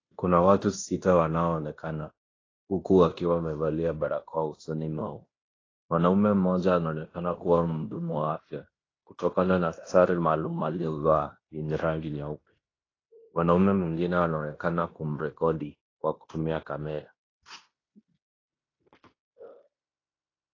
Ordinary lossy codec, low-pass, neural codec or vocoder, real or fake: AAC, 32 kbps; 7.2 kHz; codec, 16 kHz in and 24 kHz out, 0.9 kbps, LongCat-Audio-Codec, fine tuned four codebook decoder; fake